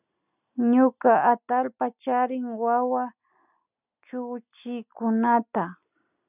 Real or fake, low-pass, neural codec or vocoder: real; 3.6 kHz; none